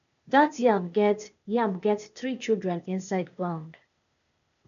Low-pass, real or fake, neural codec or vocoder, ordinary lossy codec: 7.2 kHz; fake; codec, 16 kHz, 0.8 kbps, ZipCodec; AAC, 96 kbps